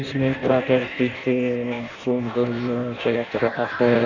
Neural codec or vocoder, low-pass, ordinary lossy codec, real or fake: codec, 16 kHz in and 24 kHz out, 0.6 kbps, FireRedTTS-2 codec; 7.2 kHz; none; fake